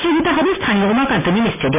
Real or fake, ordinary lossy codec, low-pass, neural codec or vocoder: real; MP3, 32 kbps; 3.6 kHz; none